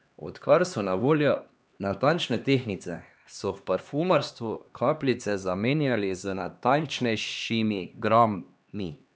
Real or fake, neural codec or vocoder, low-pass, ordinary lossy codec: fake; codec, 16 kHz, 2 kbps, X-Codec, HuBERT features, trained on LibriSpeech; none; none